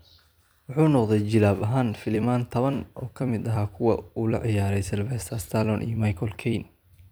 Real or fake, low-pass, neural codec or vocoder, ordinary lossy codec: fake; none; vocoder, 44.1 kHz, 128 mel bands every 256 samples, BigVGAN v2; none